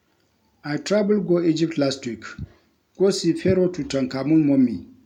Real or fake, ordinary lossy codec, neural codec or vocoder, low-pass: real; none; none; 19.8 kHz